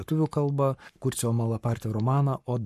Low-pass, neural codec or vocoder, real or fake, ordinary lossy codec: 14.4 kHz; codec, 44.1 kHz, 7.8 kbps, Pupu-Codec; fake; MP3, 64 kbps